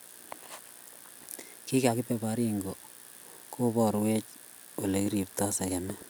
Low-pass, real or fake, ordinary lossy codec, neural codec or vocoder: none; real; none; none